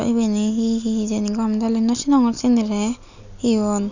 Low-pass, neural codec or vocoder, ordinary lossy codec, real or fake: 7.2 kHz; none; none; real